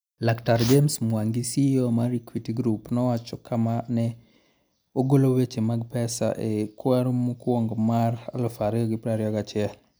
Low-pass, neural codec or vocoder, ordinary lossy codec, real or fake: none; none; none; real